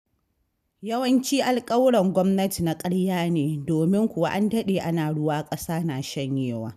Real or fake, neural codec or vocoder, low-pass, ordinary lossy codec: real; none; 14.4 kHz; none